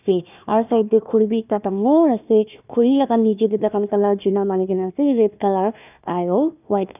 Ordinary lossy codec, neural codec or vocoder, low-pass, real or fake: none; codec, 16 kHz, 1 kbps, FunCodec, trained on Chinese and English, 50 frames a second; 3.6 kHz; fake